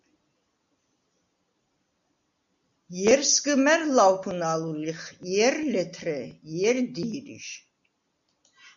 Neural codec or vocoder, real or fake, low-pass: none; real; 7.2 kHz